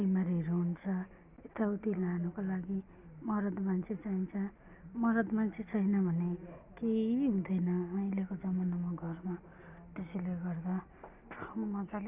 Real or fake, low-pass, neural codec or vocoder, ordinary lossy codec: real; 3.6 kHz; none; none